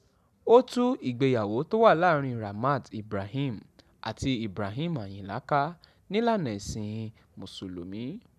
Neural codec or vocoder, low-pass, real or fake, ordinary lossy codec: none; 14.4 kHz; real; none